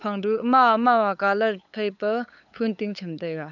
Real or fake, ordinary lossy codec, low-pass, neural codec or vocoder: fake; none; 7.2 kHz; codec, 16 kHz, 8 kbps, FunCodec, trained on LibriTTS, 25 frames a second